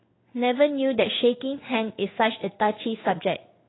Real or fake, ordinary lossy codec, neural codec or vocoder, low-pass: fake; AAC, 16 kbps; codec, 16 kHz in and 24 kHz out, 1 kbps, XY-Tokenizer; 7.2 kHz